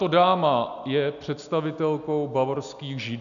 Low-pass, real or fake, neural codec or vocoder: 7.2 kHz; real; none